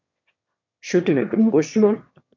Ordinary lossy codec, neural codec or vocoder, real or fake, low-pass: MP3, 64 kbps; autoencoder, 22.05 kHz, a latent of 192 numbers a frame, VITS, trained on one speaker; fake; 7.2 kHz